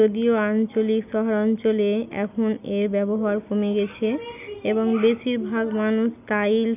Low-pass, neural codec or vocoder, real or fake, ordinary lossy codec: 3.6 kHz; none; real; none